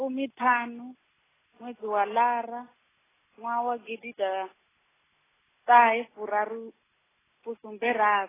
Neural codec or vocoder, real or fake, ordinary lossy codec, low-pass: none; real; AAC, 16 kbps; 3.6 kHz